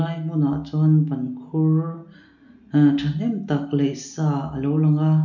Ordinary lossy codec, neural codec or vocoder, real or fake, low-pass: none; none; real; 7.2 kHz